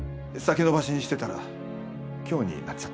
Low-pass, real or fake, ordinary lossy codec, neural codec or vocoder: none; real; none; none